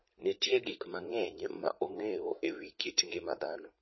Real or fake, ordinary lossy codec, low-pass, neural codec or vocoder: fake; MP3, 24 kbps; 7.2 kHz; vocoder, 44.1 kHz, 80 mel bands, Vocos